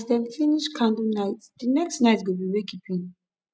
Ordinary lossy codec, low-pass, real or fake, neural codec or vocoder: none; none; real; none